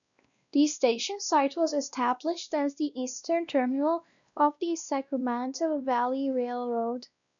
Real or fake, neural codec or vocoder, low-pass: fake; codec, 16 kHz, 1 kbps, X-Codec, WavLM features, trained on Multilingual LibriSpeech; 7.2 kHz